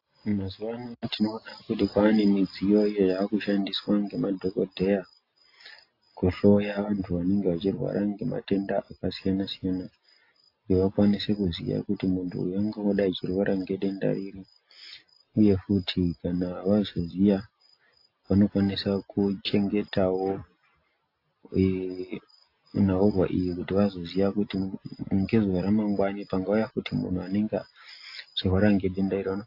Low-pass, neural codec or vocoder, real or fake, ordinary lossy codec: 5.4 kHz; none; real; AAC, 32 kbps